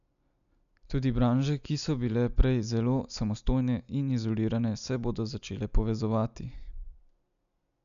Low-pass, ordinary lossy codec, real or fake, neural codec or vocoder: 7.2 kHz; none; real; none